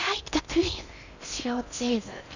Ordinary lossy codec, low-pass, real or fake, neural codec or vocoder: none; 7.2 kHz; fake; codec, 16 kHz in and 24 kHz out, 0.6 kbps, FocalCodec, streaming, 4096 codes